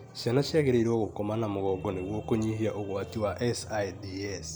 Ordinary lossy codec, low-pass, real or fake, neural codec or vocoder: none; none; real; none